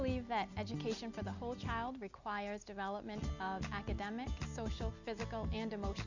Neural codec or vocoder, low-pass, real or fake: none; 7.2 kHz; real